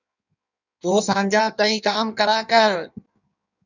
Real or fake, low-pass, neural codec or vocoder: fake; 7.2 kHz; codec, 16 kHz in and 24 kHz out, 1.1 kbps, FireRedTTS-2 codec